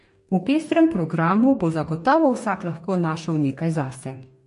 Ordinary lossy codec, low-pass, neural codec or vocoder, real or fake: MP3, 48 kbps; 14.4 kHz; codec, 44.1 kHz, 2.6 kbps, DAC; fake